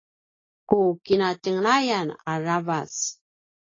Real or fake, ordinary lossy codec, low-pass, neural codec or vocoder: real; AAC, 32 kbps; 7.2 kHz; none